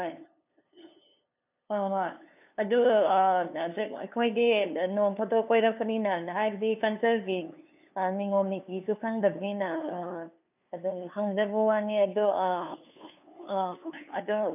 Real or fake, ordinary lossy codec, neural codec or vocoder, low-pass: fake; none; codec, 16 kHz, 2 kbps, FunCodec, trained on LibriTTS, 25 frames a second; 3.6 kHz